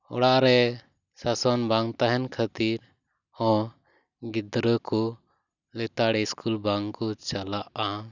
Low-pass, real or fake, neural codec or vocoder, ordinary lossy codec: 7.2 kHz; real; none; none